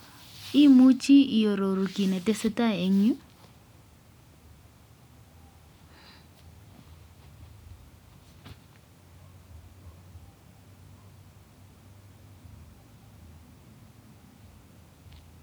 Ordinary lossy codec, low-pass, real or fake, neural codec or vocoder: none; none; real; none